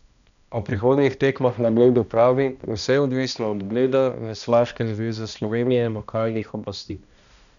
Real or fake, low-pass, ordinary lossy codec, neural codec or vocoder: fake; 7.2 kHz; none; codec, 16 kHz, 1 kbps, X-Codec, HuBERT features, trained on balanced general audio